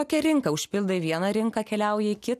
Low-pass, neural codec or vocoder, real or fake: 14.4 kHz; none; real